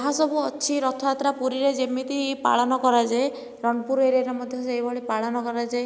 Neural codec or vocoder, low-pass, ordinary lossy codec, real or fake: none; none; none; real